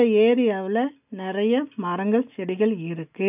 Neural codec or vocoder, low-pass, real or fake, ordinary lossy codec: none; 3.6 kHz; real; none